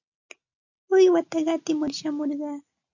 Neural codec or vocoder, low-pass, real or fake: none; 7.2 kHz; real